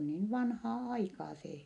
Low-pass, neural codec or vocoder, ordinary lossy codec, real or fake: 10.8 kHz; none; none; real